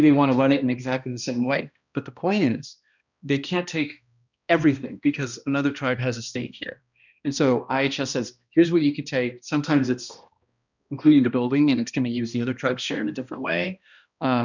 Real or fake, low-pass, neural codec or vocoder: fake; 7.2 kHz; codec, 16 kHz, 1 kbps, X-Codec, HuBERT features, trained on balanced general audio